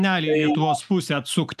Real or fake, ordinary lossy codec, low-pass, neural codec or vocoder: real; AAC, 96 kbps; 14.4 kHz; none